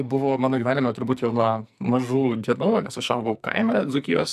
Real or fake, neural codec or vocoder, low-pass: fake; codec, 44.1 kHz, 2.6 kbps, SNAC; 14.4 kHz